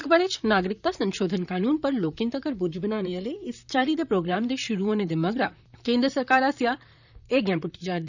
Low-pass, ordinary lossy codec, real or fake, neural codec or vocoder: 7.2 kHz; none; fake; vocoder, 44.1 kHz, 128 mel bands, Pupu-Vocoder